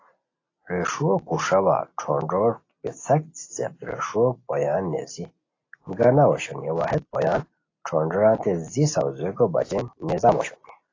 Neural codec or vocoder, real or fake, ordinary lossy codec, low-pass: none; real; AAC, 32 kbps; 7.2 kHz